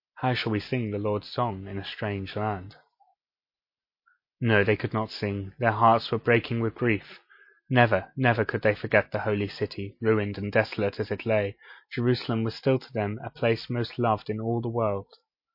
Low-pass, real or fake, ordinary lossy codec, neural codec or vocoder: 5.4 kHz; real; MP3, 32 kbps; none